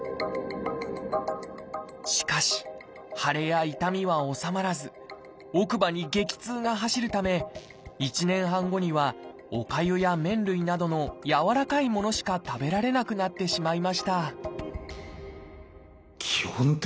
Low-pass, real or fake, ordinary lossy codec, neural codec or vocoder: none; real; none; none